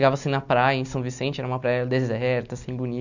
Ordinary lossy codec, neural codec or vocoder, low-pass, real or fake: none; none; 7.2 kHz; real